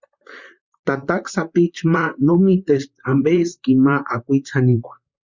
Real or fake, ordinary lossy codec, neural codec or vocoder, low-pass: fake; Opus, 64 kbps; vocoder, 44.1 kHz, 128 mel bands, Pupu-Vocoder; 7.2 kHz